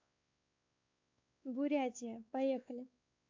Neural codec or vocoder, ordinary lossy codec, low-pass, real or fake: codec, 16 kHz, 4 kbps, X-Codec, WavLM features, trained on Multilingual LibriSpeech; AAC, 48 kbps; 7.2 kHz; fake